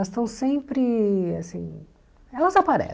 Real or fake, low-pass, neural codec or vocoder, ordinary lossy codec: real; none; none; none